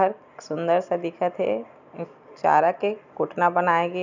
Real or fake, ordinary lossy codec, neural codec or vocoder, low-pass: real; none; none; 7.2 kHz